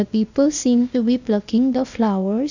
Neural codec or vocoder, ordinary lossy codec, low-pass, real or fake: codec, 16 kHz, 0.8 kbps, ZipCodec; none; 7.2 kHz; fake